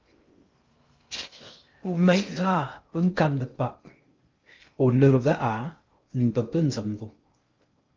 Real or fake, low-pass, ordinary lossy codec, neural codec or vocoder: fake; 7.2 kHz; Opus, 24 kbps; codec, 16 kHz in and 24 kHz out, 0.6 kbps, FocalCodec, streaming, 2048 codes